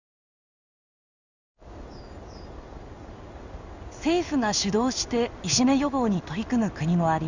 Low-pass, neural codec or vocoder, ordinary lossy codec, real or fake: 7.2 kHz; codec, 16 kHz in and 24 kHz out, 1 kbps, XY-Tokenizer; none; fake